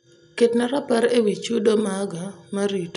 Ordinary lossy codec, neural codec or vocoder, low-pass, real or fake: none; none; 10.8 kHz; real